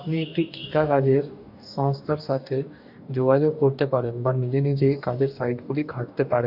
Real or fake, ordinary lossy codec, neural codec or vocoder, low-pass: fake; none; codec, 44.1 kHz, 2.6 kbps, DAC; 5.4 kHz